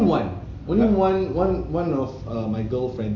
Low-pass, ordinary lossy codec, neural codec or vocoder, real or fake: 7.2 kHz; none; none; real